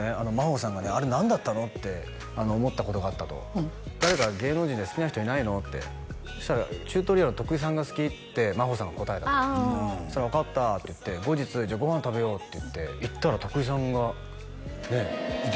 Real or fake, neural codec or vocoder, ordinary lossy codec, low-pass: real; none; none; none